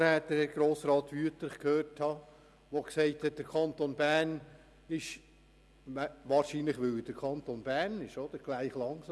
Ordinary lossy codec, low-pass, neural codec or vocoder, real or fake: none; none; none; real